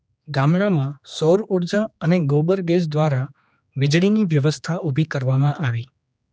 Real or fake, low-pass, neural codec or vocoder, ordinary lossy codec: fake; none; codec, 16 kHz, 2 kbps, X-Codec, HuBERT features, trained on general audio; none